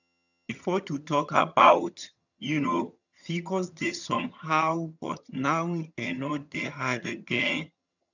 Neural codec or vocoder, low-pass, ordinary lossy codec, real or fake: vocoder, 22.05 kHz, 80 mel bands, HiFi-GAN; 7.2 kHz; none; fake